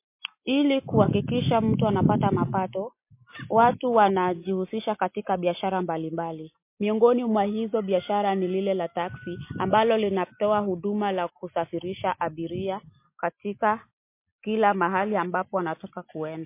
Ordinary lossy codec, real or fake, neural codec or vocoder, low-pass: MP3, 24 kbps; real; none; 3.6 kHz